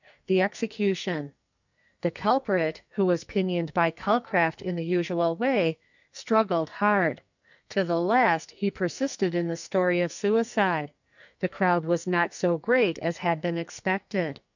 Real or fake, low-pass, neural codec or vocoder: fake; 7.2 kHz; codec, 44.1 kHz, 2.6 kbps, SNAC